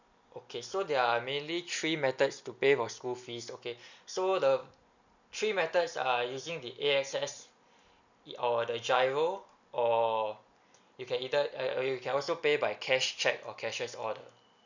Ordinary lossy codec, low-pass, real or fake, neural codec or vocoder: none; 7.2 kHz; real; none